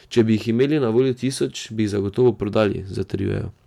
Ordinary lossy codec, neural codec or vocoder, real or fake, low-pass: MP3, 96 kbps; none; real; 19.8 kHz